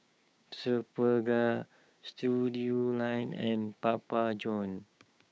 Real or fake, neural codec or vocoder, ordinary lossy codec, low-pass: fake; codec, 16 kHz, 6 kbps, DAC; none; none